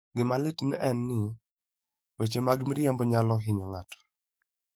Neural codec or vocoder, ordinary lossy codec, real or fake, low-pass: codec, 44.1 kHz, 7.8 kbps, DAC; none; fake; none